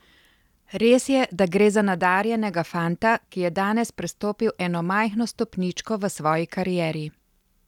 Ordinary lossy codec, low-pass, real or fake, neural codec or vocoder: none; 19.8 kHz; real; none